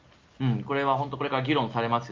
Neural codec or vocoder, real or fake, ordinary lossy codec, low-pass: none; real; Opus, 24 kbps; 7.2 kHz